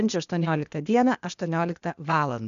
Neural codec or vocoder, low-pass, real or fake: codec, 16 kHz, 0.8 kbps, ZipCodec; 7.2 kHz; fake